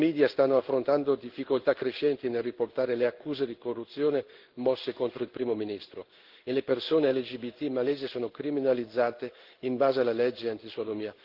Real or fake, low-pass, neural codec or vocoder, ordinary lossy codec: fake; 5.4 kHz; codec, 16 kHz in and 24 kHz out, 1 kbps, XY-Tokenizer; Opus, 16 kbps